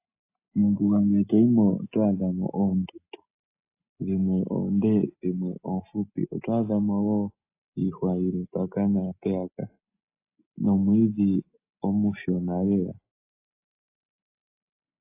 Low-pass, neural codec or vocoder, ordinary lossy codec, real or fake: 3.6 kHz; none; AAC, 24 kbps; real